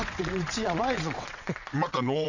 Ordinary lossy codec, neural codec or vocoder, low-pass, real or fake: none; vocoder, 22.05 kHz, 80 mel bands, WaveNeXt; 7.2 kHz; fake